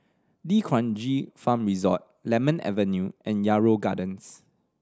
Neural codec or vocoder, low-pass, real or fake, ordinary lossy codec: none; none; real; none